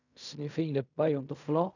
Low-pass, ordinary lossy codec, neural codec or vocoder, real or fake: 7.2 kHz; none; codec, 16 kHz in and 24 kHz out, 0.4 kbps, LongCat-Audio-Codec, fine tuned four codebook decoder; fake